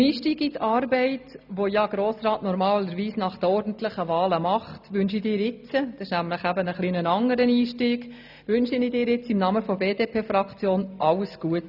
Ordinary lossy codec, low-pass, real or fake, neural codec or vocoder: none; 5.4 kHz; real; none